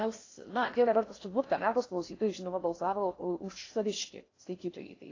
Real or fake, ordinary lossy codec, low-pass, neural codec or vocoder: fake; AAC, 32 kbps; 7.2 kHz; codec, 16 kHz in and 24 kHz out, 0.6 kbps, FocalCodec, streaming, 4096 codes